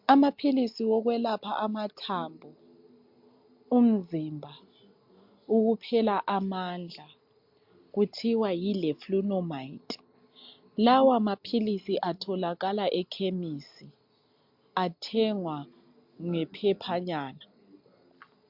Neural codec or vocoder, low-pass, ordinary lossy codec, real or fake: none; 5.4 kHz; MP3, 48 kbps; real